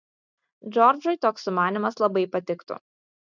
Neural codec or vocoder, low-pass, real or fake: none; 7.2 kHz; real